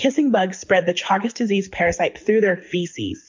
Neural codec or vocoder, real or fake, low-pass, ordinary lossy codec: codec, 24 kHz, 6 kbps, HILCodec; fake; 7.2 kHz; MP3, 48 kbps